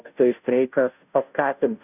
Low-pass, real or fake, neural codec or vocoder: 3.6 kHz; fake; codec, 16 kHz, 0.5 kbps, FunCodec, trained on Chinese and English, 25 frames a second